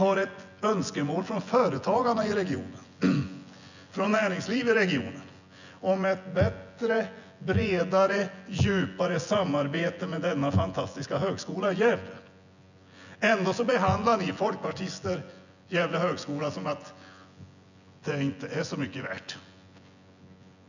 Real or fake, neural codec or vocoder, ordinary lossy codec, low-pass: fake; vocoder, 24 kHz, 100 mel bands, Vocos; none; 7.2 kHz